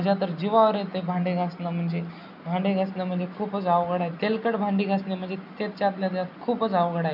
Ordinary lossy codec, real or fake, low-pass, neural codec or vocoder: none; real; 5.4 kHz; none